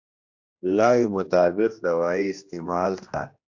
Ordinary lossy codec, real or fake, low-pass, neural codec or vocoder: AAC, 48 kbps; fake; 7.2 kHz; codec, 16 kHz, 1 kbps, X-Codec, HuBERT features, trained on general audio